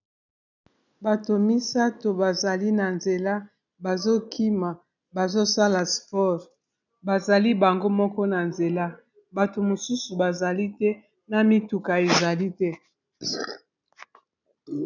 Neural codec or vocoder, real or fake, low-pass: none; real; 7.2 kHz